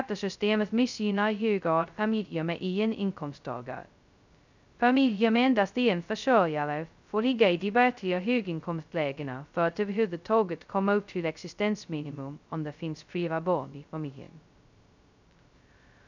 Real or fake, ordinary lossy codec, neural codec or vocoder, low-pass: fake; none; codec, 16 kHz, 0.2 kbps, FocalCodec; 7.2 kHz